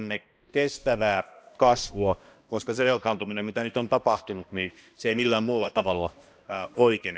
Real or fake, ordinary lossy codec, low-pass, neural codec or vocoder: fake; none; none; codec, 16 kHz, 1 kbps, X-Codec, HuBERT features, trained on balanced general audio